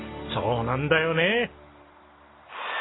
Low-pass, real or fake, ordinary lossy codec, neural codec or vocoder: 7.2 kHz; real; AAC, 16 kbps; none